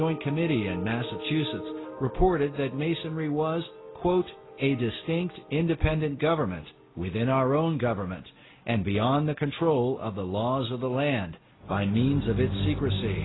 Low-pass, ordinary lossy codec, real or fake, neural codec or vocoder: 7.2 kHz; AAC, 16 kbps; real; none